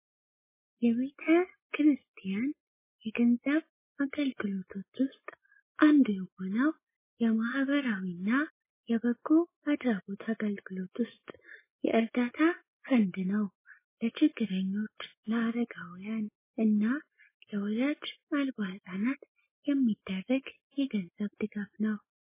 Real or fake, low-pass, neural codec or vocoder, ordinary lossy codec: real; 3.6 kHz; none; MP3, 16 kbps